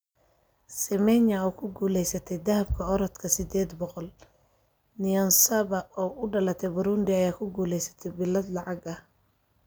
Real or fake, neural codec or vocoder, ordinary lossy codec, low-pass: real; none; none; none